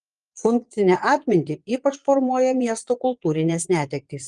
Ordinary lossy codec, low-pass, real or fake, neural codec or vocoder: Opus, 24 kbps; 9.9 kHz; fake; vocoder, 22.05 kHz, 80 mel bands, Vocos